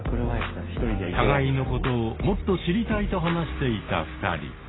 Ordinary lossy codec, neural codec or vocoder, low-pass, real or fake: AAC, 16 kbps; none; 7.2 kHz; real